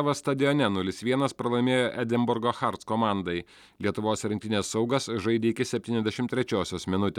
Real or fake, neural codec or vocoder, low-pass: real; none; 19.8 kHz